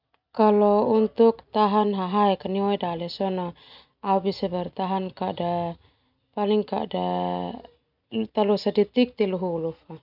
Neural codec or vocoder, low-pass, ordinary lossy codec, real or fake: none; 5.4 kHz; none; real